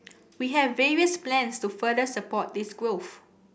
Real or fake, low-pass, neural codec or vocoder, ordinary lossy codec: real; none; none; none